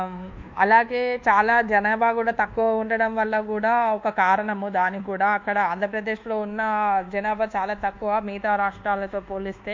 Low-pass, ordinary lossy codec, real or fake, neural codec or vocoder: 7.2 kHz; MP3, 48 kbps; fake; codec, 24 kHz, 1.2 kbps, DualCodec